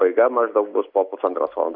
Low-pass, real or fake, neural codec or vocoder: 5.4 kHz; real; none